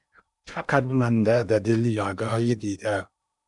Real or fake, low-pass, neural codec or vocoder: fake; 10.8 kHz; codec, 16 kHz in and 24 kHz out, 0.8 kbps, FocalCodec, streaming, 65536 codes